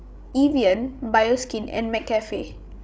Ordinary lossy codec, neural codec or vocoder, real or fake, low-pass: none; codec, 16 kHz, 16 kbps, FreqCodec, larger model; fake; none